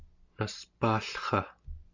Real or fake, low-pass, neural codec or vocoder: real; 7.2 kHz; none